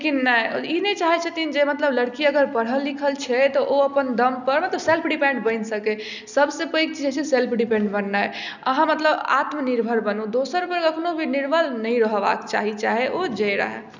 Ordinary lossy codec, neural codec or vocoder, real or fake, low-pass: none; none; real; 7.2 kHz